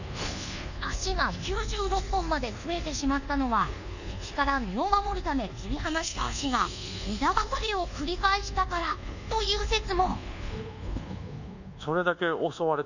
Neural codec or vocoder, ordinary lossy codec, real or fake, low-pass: codec, 24 kHz, 1.2 kbps, DualCodec; none; fake; 7.2 kHz